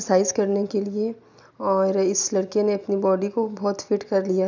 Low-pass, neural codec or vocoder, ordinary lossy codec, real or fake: 7.2 kHz; none; none; real